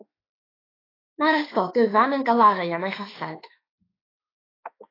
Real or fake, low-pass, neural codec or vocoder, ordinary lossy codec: fake; 5.4 kHz; autoencoder, 48 kHz, 32 numbers a frame, DAC-VAE, trained on Japanese speech; AAC, 24 kbps